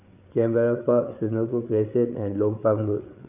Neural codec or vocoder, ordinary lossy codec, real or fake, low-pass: codec, 16 kHz, 8 kbps, FreqCodec, larger model; none; fake; 3.6 kHz